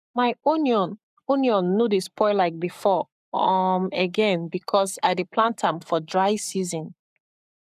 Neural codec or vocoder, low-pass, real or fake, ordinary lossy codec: codec, 44.1 kHz, 7.8 kbps, Pupu-Codec; 14.4 kHz; fake; none